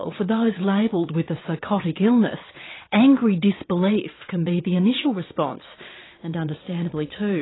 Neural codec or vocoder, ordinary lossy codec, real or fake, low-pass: vocoder, 22.05 kHz, 80 mel bands, WaveNeXt; AAC, 16 kbps; fake; 7.2 kHz